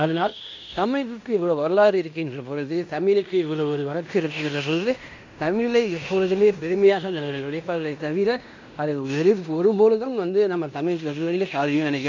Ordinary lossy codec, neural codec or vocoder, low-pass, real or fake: MP3, 64 kbps; codec, 16 kHz in and 24 kHz out, 0.9 kbps, LongCat-Audio-Codec, fine tuned four codebook decoder; 7.2 kHz; fake